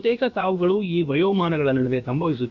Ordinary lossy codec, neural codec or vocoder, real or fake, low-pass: none; codec, 16 kHz, about 1 kbps, DyCAST, with the encoder's durations; fake; 7.2 kHz